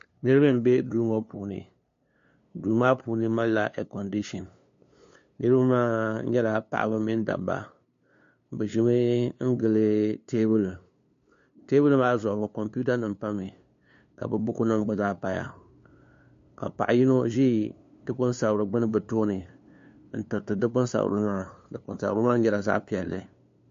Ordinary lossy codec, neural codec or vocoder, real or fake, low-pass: MP3, 48 kbps; codec, 16 kHz, 2 kbps, FunCodec, trained on LibriTTS, 25 frames a second; fake; 7.2 kHz